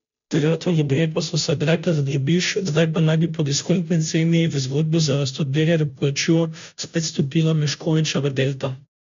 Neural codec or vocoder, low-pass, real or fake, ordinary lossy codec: codec, 16 kHz, 0.5 kbps, FunCodec, trained on Chinese and English, 25 frames a second; 7.2 kHz; fake; MP3, 64 kbps